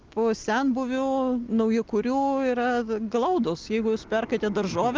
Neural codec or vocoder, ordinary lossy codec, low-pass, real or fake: none; Opus, 32 kbps; 7.2 kHz; real